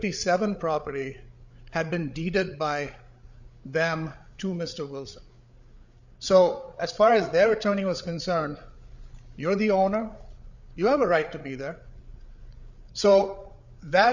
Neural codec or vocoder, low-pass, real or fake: codec, 16 kHz, 8 kbps, FreqCodec, larger model; 7.2 kHz; fake